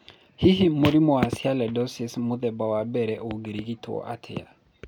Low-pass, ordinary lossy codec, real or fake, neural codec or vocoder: 19.8 kHz; none; real; none